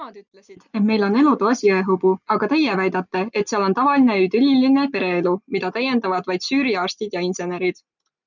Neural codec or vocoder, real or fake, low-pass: none; real; 7.2 kHz